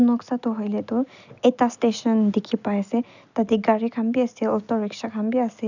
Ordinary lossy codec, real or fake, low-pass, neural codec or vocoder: none; real; 7.2 kHz; none